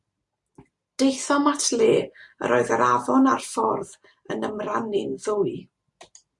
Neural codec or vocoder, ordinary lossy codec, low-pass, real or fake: none; MP3, 64 kbps; 10.8 kHz; real